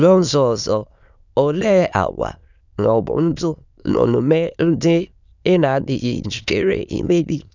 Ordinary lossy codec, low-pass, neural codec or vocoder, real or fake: none; 7.2 kHz; autoencoder, 22.05 kHz, a latent of 192 numbers a frame, VITS, trained on many speakers; fake